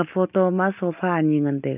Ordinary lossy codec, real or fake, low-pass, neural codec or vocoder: none; real; 3.6 kHz; none